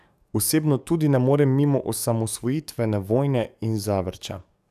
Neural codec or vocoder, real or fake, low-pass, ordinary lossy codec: codec, 44.1 kHz, 7.8 kbps, DAC; fake; 14.4 kHz; none